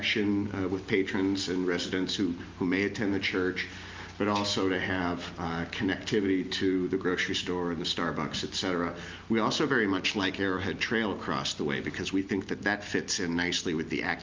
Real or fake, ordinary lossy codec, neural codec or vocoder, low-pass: real; Opus, 24 kbps; none; 7.2 kHz